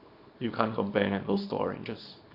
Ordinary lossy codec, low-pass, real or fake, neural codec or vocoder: MP3, 48 kbps; 5.4 kHz; fake; codec, 24 kHz, 0.9 kbps, WavTokenizer, small release